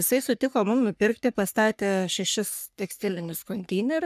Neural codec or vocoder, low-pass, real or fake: codec, 44.1 kHz, 3.4 kbps, Pupu-Codec; 14.4 kHz; fake